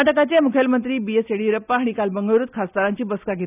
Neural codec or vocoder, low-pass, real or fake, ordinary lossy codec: none; 3.6 kHz; real; none